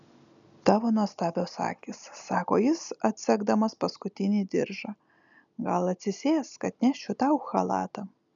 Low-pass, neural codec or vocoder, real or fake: 7.2 kHz; none; real